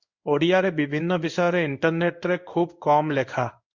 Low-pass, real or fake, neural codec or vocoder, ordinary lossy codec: 7.2 kHz; fake; codec, 16 kHz in and 24 kHz out, 1 kbps, XY-Tokenizer; Opus, 64 kbps